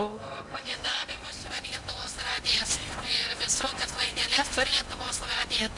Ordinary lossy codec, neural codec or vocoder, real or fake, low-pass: MP3, 64 kbps; codec, 16 kHz in and 24 kHz out, 0.8 kbps, FocalCodec, streaming, 65536 codes; fake; 10.8 kHz